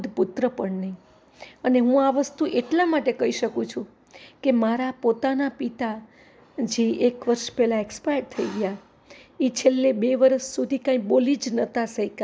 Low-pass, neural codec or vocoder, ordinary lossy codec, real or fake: none; none; none; real